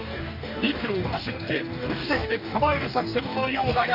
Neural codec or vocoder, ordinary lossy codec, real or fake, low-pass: codec, 44.1 kHz, 2.6 kbps, DAC; none; fake; 5.4 kHz